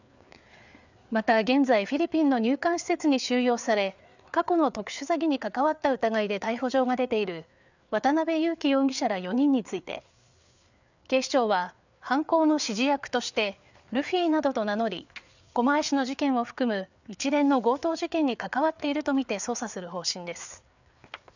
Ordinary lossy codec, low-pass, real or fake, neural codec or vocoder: none; 7.2 kHz; fake; codec, 16 kHz, 4 kbps, FreqCodec, larger model